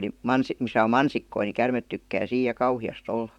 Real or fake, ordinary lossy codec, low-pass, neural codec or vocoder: fake; none; 19.8 kHz; vocoder, 44.1 kHz, 128 mel bands, Pupu-Vocoder